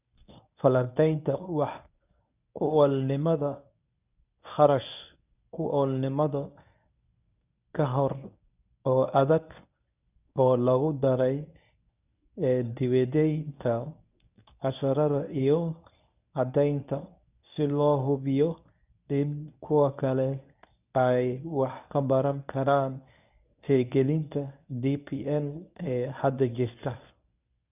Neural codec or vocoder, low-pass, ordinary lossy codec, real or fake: codec, 24 kHz, 0.9 kbps, WavTokenizer, medium speech release version 1; 3.6 kHz; none; fake